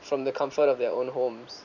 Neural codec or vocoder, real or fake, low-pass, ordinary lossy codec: vocoder, 44.1 kHz, 128 mel bands every 512 samples, BigVGAN v2; fake; 7.2 kHz; none